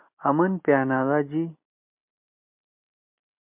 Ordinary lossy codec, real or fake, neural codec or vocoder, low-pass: MP3, 32 kbps; real; none; 3.6 kHz